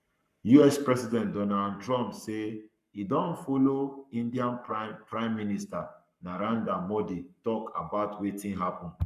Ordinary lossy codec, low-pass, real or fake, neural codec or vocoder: none; 14.4 kHz; fake; codec, 44.1 kHz, 7.8 kbps, Pupu-Codec